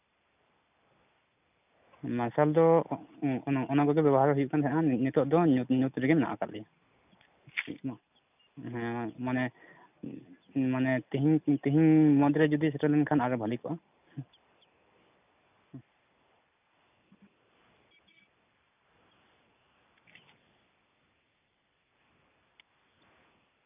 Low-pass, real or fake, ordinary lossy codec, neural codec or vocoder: 3.6 kHz; real; none; none